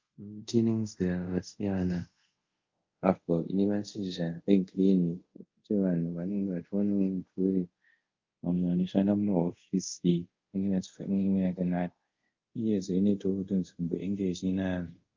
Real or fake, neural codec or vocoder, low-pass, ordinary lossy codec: fake; codec, 24 kHz, 0.5 kbps, DualCodec; 7.2 kHz; Opus, 32 kbps